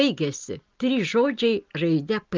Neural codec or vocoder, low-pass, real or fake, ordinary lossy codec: none; 7.2 kHz; real; Opus, 24 kbps